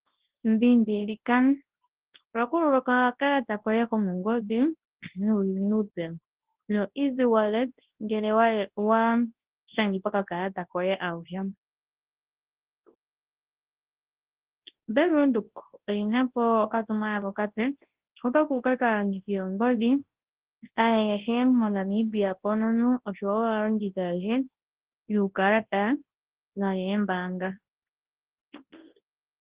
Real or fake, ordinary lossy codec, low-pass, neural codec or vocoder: fake; Opus, 16 kbps; 3.6 kHz; codec, 24 kHz, 0.9 kbps, WavTokenizer, large speech release